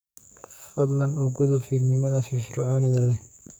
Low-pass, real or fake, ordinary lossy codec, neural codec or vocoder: none; fake; none; codec, 44.1 kHz, 2.6 kbps, SNAC